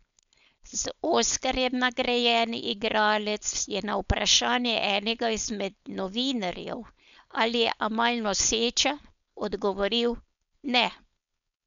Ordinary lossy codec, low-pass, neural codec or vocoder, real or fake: none; 7.2 kHz; codec, 16 kHz, 4.8 kbps, FACodec; fake